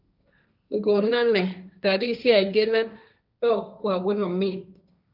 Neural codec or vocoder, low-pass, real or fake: codec, 16 kHz, 1.1 kbps, Voila-Tokenizer; 5.4 kHz; fake